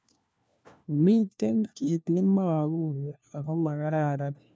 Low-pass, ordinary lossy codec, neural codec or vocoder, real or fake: none; none; codec, 16 kHz, 1 kbps, FunCodec, trained on LibriTTS, 50 frames a second; fake